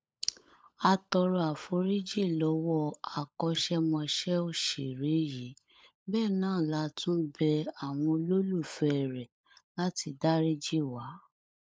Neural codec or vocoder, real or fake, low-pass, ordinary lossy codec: codec, 16 kHz, 16 kbps, FunCodec, trained on LibriTTS, 50 frames a second; fake; none; none